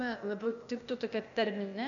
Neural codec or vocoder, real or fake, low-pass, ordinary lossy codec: codec, 16 kHz, 0.8 kbps, ZipCodec; fake; 7.2 kHz; MP3, 96 kbps